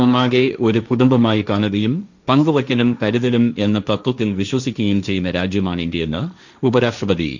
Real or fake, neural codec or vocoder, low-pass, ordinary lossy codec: fake; codec, 16 kHz, 1.1 kbps, Voila-Tokenizer; 7.2 kHz; none